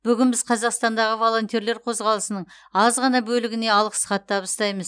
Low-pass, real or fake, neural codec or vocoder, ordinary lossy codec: 9.9 kHz; real; none; none